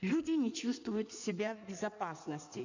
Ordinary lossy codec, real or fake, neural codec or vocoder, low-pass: none; fake; codec, 16 kHz in and 24 kHz out, 1.1 kbps, FireRedTTS-2 codec; 7.2 kHz